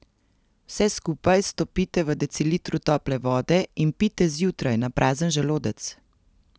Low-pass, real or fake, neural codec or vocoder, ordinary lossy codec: none; real; none; none